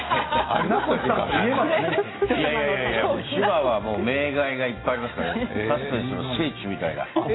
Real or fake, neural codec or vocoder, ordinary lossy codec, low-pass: real; none; AAC, 16 kbps; 7.2 kHz